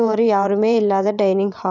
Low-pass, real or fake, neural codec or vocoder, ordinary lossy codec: 7.2 kHz; fake; vocoder, 22.05 kHz, 80 mel bands, WaveNeXt; none